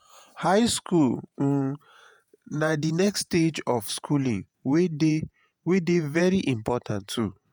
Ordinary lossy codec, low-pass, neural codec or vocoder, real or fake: none; none; vocoder, 48 kHz, 128 mel bands, Vocos; fake